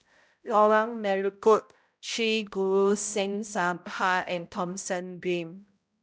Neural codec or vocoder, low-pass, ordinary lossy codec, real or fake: codec, 16 kHz, 0.5 kbps, X-Codec, HuBERT features, trained on balanced general audio; none; none; fake